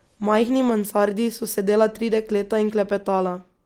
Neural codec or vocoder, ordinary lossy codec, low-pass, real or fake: none; Opus, 24 kbps; 19.8 kHz; real